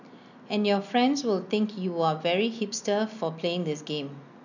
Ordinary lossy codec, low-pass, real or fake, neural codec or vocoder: none; 7.2 kHz; real; none